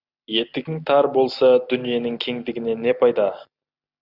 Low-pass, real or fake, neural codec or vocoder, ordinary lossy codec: 5.4 kHz; real; none; Opus, 64 kbps